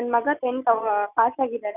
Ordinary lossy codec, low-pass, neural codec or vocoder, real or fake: none; 3.6 kHz; none; real